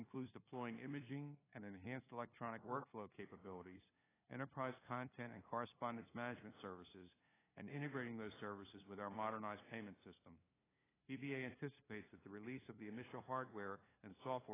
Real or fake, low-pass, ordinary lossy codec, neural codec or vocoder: fake; 3.6 kHz; AAC, 16 kbps; codec, 16 kHz, 2 kbps, FunCodec, trained on LibriTTS, 25 frames a second